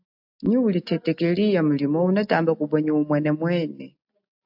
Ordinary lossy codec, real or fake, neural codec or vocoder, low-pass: AAC, 48 kbps; real; none; 5.4 kHz